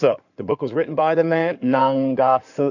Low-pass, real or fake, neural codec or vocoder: 7.2 kHz; fake; codec, 16 kHz in and 24 kHz out, 2.2 kbps, FireRedTTS-2 codec